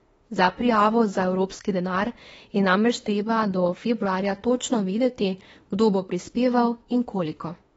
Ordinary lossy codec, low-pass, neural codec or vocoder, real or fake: AAC, 24 kbps; 19.8 kHz; autoencoder, 48 kHz, 32 numbers a frame, DAC-VAE, trained on Japanese speech; fake